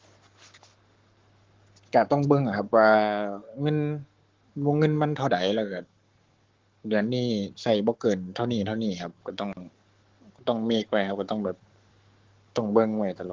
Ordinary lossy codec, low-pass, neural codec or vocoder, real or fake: Opus, 16 kbps; 7.2 kHz; codec, 44.1 kHz, 7.8 kbps, Pupu-Codec; fake